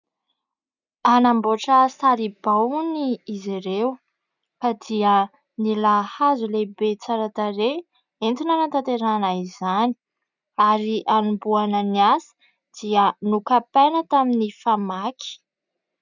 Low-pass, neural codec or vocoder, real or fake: 7.2 kHz; none; real